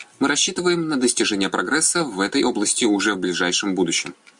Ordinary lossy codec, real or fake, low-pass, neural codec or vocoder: MP3, 64 kbps; real; 10.8 kHz; none